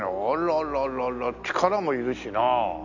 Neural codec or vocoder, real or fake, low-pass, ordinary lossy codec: none; real; 7.2 kHz; none